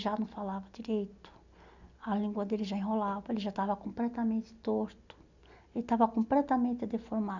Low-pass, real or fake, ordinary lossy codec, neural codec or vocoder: 7.2 kHz; real; none; none